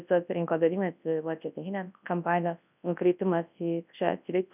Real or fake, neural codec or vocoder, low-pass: fake; codec, 24 kHz, 0.9 kbps, WavTokenizer, large speech release; 3.6 kHz